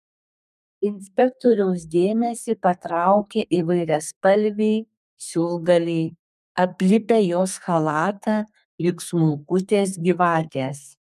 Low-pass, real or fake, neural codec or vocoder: 14.4 kHz; fake; codec, 32 kHz, 1.9 kbps, SNAC